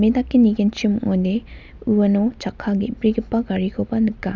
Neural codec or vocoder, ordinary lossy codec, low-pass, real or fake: none; none; 7.2 kHz; real